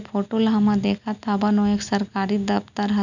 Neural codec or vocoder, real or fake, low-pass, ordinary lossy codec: none; real; 7.2 kHz; none